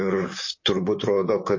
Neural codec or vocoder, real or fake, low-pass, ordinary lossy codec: vocoder, 44.1 kHz, 128 mel bands, Pupu-Vocoder; fake; 7.2 kHz; MP3, 32 kbps